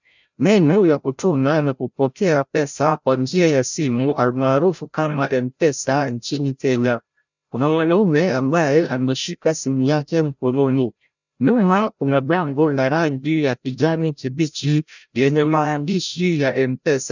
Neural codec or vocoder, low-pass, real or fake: codec, 16 kHz, 0.5 kbps, FreqCodec, larger model; 7.2 kHz; fake